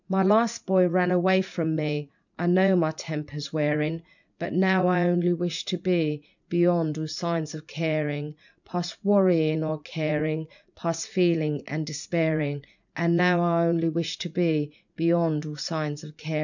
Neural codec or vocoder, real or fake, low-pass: vocoder, 44.1 kHz, 80 mel bands, Vocos; fake; 7.2 kHz